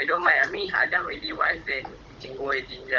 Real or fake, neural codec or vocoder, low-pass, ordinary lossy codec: fake; codec, 16 kHz, 16 kbps, FunCodec, trained on Chinese and English, 50 frames a second; 7.2 kHz; Opus, 24 kbps